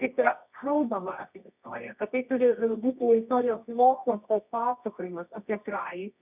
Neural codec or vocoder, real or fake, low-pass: codec, 24 kHz, 0.9 kbps, WavTokenizer, medium music audio release; fake; 3.6 kHz